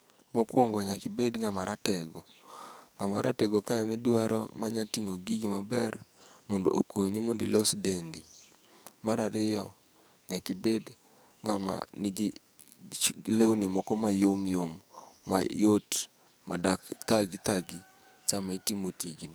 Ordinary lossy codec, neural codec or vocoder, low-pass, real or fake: none; codec, 44.1 kHz, 2.6 kbps, SNAC; none; fake